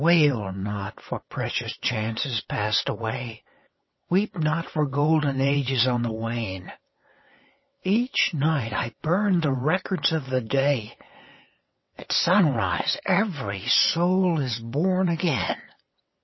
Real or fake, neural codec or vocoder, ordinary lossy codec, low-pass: fake; vocoder, 22.05 kHz, 80 mel bands, WaveNeXt; MP3, 24 kbps; 7.2 kHz